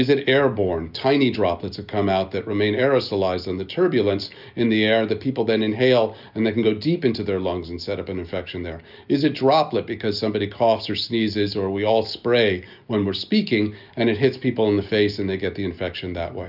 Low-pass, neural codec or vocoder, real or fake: 5.4 kHz; none; real